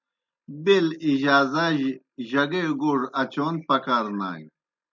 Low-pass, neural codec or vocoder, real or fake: 7.2 kHz; none; real